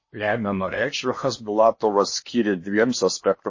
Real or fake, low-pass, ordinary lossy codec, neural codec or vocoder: fake; 7.2 kHz; MP3, 32 kbps; codec, 16 kHz in and 24 kHz out, 0.8 kbps, FocalCodec, streaming, 65536 codes